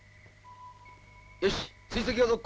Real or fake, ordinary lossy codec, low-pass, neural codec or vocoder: real; none; none; none